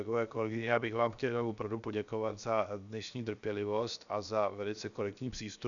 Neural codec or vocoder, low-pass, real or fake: codec, 16 kHz, 0.7 kbps, FocalCodec; 7.2 kHz; fake